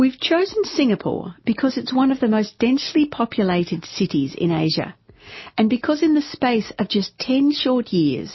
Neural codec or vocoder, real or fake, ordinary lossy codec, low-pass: none; real; MP3, 24 kbps; 7.2 kHz